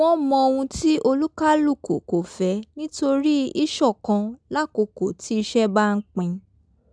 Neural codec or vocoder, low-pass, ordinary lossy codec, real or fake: none; 9.9 kHz; none; real